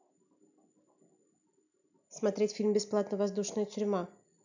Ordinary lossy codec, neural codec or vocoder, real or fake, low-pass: none; none; real; 7.2 kHz